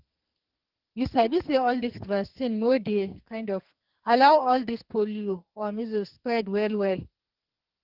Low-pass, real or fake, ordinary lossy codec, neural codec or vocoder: 5.4 kHz; fake; Opus, 16 kbps; codec, 44.1 kHz, 2.6 kbps, SNAC